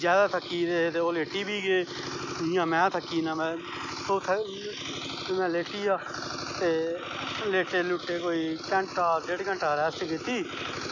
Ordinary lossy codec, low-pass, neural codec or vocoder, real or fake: none; 7.2 kHz; none; real